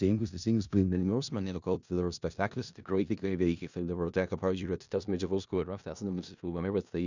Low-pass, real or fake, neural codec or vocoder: 7.2 kHz; fake; codec, 16 kHz in and 24 kHz out, 0.4 kbps, LongCat-Audio-Codec, four codebook decoder